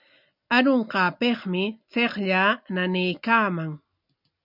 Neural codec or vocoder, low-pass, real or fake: none; 5.4 kHz; real